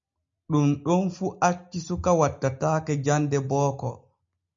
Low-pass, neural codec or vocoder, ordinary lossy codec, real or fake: 7.2 kHz; none; MP3, 48 kbps; real